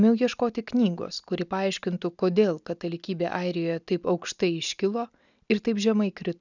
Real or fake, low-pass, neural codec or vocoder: real; 7.2 kHz; none